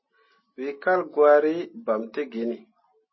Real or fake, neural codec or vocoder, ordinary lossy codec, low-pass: real; none; MP3, 24 kbps; 7.2 kHz